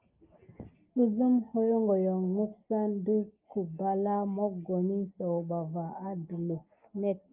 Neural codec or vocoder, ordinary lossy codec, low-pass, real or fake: none; Opus, 24 kbps; 3.6 kHz; real